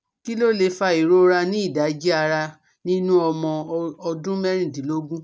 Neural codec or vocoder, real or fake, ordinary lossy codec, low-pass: none; real; none; none